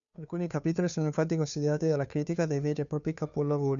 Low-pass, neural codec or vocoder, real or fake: 7.2 kHz; codec, 16 kHz, 2 kbps, FunCodec, trained on Chinese and English, 25 frames a second; fake